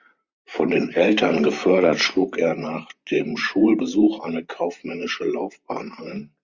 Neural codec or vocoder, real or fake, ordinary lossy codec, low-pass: vocoder, 44.1 kHz, 128 mel bands, Pupu-Vocoder; fake; Opus, 64 kbps; 7.2 kHz